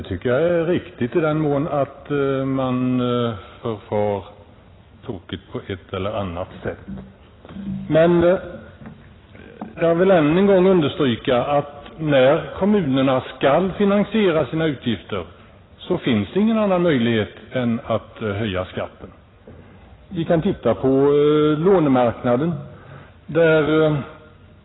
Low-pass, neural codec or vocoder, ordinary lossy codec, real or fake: 7.2 kHz; none; AAC, 16 kbps; real